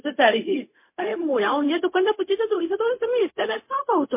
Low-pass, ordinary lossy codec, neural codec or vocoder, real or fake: 3.6 kHz; MP3, 24 kbps; codec, 16 kHz, 0.4 kbps, LongCat-Audio-Codec; fake